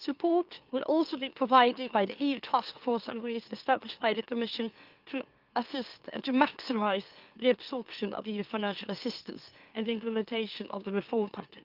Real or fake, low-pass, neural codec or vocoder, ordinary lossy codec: fake; 5.4 kHz; autoencoder, 44.1 kHz, a latent of 192 numbers a frame, MeloTTS; Opus, 32 kbps